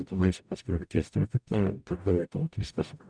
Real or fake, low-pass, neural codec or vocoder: fake; 9.9 kHz; codec, 44.1 kHz, 0.9 kbps, DAC